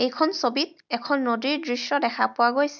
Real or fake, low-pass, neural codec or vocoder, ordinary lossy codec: real; 7.2 kHz; none; none